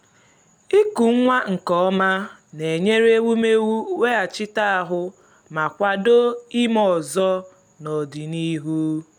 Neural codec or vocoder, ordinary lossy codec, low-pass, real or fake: none; none; 19.8 kHz; real